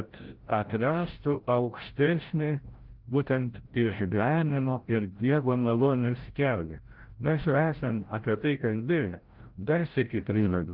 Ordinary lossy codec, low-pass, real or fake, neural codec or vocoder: Opus, 16 kbps; 5.4 kHz; fake; codec, 16 kHz, 0.5 kbps, FreqCodec, larger model